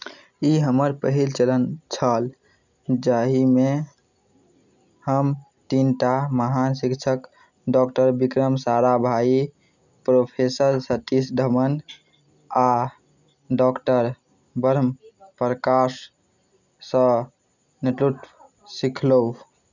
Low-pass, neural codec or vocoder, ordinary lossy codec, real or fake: 7.2 kHz; none; none; real